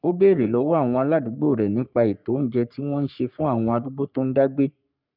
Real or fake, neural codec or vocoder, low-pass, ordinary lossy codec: fake; codec, 44.1 kHz, 3.4 kbps, Pupu-Codec; 5.4 kHz; none